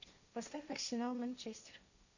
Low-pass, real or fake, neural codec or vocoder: 7.2 kHz; fake; codec, 16 kHz, 1.1 kbps, Voila-Tokenizer